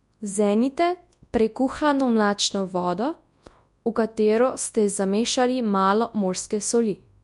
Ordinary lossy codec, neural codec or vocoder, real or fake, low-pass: MP3, 64 kbps; codec, 24 kHz, 0.9 kbps, WavTokenizer, large speech release; fake; 10.8 kHz